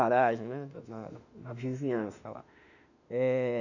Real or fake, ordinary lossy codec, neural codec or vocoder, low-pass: fake; none; autoencoder, 48 kHz, 32 numbers a frame, DAC-VAE, trained on Japanese speech; 7.2 kHz